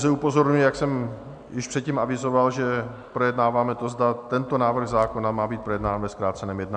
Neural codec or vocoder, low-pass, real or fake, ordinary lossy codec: none; 9.9 kHz; real; MP3, 96 kbps